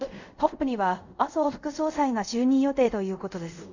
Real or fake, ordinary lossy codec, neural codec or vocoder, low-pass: fake; none; codec, 24 kHz, 0.5 kbps, DualCodec; 7.2 kHz